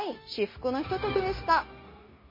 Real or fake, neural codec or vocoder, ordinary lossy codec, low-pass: fake; codec, 16 kHz, 0.9 kbps, LongCat-Audio-Codec; MP3, 24 kbps; 5.4 kHz